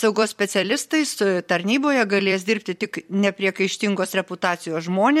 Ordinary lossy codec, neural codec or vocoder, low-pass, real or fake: MP3, 64 kbps; vocoder, 44.1 kHz, 128 mel bands every 256 samples, BigVGAN v2; 19.8 kHz; fake